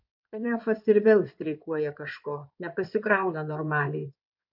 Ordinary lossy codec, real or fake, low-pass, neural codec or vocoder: MP3, 48 kbps; fake; 5.4 kHz; codec, 16 kHz in and 24 kHz out, 2.2 kbps, FireRedTTS-2 codec